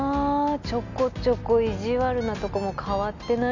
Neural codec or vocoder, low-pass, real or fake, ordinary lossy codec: none; 7.2 kHz; real; none